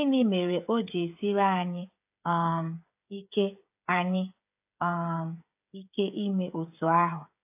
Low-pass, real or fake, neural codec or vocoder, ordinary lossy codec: 3.6 kHz; fake; codec, 16 kHz, 8 kbps, FreqCodec, smaller model; AAC, 32 kbps